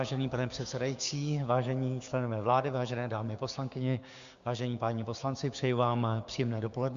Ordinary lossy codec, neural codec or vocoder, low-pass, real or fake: Opus, 64 kbps; codec, 16 kHz, 6 kbps, DAC; 7.2 kHz; fake